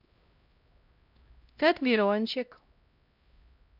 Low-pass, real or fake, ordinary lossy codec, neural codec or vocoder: 5.4 kHz; fake; none; codec, 16 kHz, 0.5 kbps, X-Codec, HuBERT features, trained on LibriSpeech